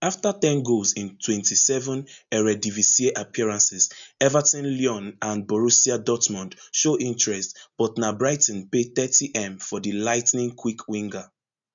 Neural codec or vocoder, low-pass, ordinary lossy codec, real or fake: none; 7.2 kHz; none; real